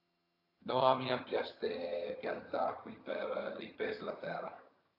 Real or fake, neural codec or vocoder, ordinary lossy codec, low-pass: fake; vocoder, 22.05 kHz, 80 mel bands, HiFi-GAN; AAC, 24 kbps; 5.4 kHz